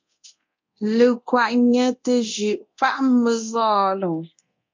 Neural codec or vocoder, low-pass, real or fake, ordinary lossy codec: codec, 24 kHz, 0.9 kbps, DualCodec; 7.2 kHz; fake; MP3, 48 kbps